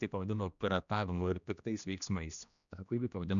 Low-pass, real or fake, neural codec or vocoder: 7.2 kHz; fake; codec, 16 kHz, 1 kbps, X-Codec, HuBERT features, trained on general audio